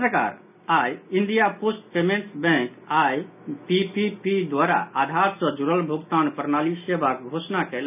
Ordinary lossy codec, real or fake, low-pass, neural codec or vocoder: none; real; 3.6 kHz; none